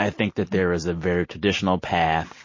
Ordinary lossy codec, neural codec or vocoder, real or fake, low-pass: MP3, 32 kbps; none; real; 7.2 kHz